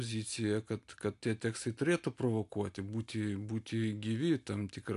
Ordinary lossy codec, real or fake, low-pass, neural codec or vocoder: AAC, 64 kbps; real; 10.8 kHz; none